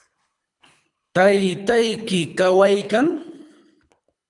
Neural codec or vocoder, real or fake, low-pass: codec, 24 kHz, 3 kbps, HILCodec; fake; 10.8 kHz